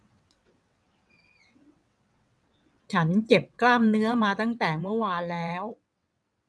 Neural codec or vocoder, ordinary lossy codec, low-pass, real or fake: vocoder, 22.05 kHz, 80 mel bands, WaveNeXt; none; none; fake